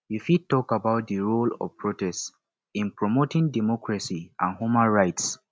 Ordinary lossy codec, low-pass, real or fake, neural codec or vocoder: none; none; real; none